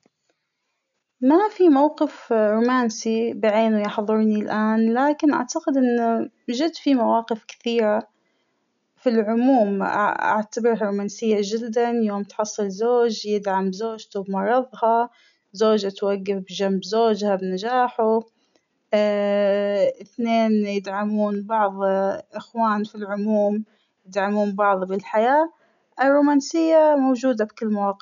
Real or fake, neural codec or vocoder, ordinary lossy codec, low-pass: real; none; none; 7.2 kHz